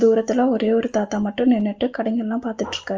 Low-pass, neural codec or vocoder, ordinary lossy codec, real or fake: 7.2 kHz; none; Opus, 24 kbps; real